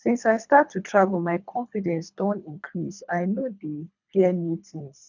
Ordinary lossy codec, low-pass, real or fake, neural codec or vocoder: none; 7.2 kHz; fake; codec, 24 kHz, 3 kbps, HILCodec